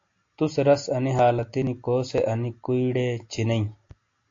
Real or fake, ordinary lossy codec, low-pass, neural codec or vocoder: real; AAC, 48 kbps; 7.2 kHz; none